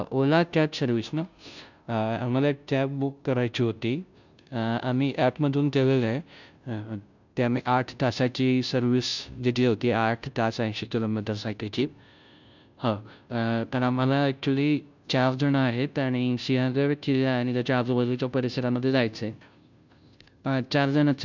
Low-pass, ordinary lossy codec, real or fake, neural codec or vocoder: 7.2 kHz; none; fake; codec, 16 kHz, 0.5 kbps, FunCodec, trained on Chinese and English, 25 frames a second